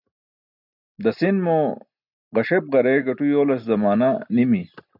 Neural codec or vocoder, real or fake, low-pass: none; real; 5.4 kHz